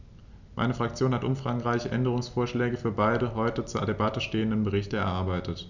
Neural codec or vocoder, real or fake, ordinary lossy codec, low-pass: none; real; none; 7.2 kHz